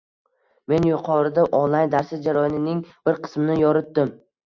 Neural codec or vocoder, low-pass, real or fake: none; 7.2 kHz; real